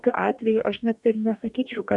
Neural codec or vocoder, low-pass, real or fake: codec, 44.1 kHz, 2.6 kbps, DAC; 10.8 kHz; fake